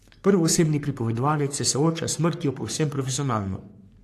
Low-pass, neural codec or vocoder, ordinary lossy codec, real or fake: 14.4 kHz; codec, 44.1 kHz, 3.4 kbps, Pupu-Codec; AAC, 64 kbps; fake